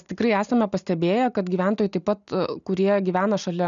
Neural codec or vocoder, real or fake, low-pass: none; real; 7.2 kHz